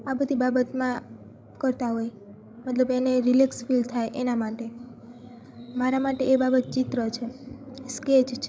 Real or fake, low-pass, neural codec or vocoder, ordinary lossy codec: fake; none; codec, 16 kHz, 16 kbps, FreqCodec, larger model; none